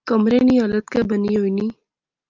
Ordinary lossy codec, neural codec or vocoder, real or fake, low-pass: Opus, 24 kbps; none; real; 7.2 kHz